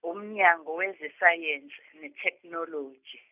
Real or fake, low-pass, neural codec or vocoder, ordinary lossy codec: real; 3.6 kHz; none; none